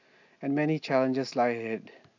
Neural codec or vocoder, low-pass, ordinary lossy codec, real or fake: none; 7.2 kHz; AAC, 48 kbps; real